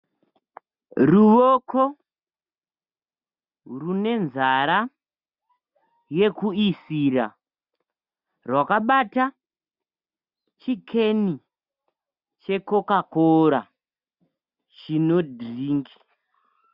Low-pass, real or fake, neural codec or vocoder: 5.4 kHz; real; none